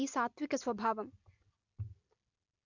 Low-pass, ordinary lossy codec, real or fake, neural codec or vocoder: 7.2 kHz; none; real; none